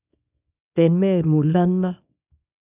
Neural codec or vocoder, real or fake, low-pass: codec, 24 kHz, 0.9 kbps, WavTokenizer, small release; fake; 3.6 kHz